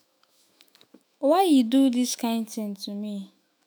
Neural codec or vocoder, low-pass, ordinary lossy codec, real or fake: autoencoder, 48 kHz, 128 numbers a frame, DAC-VAE, trained on Japanese speech; none; none; fake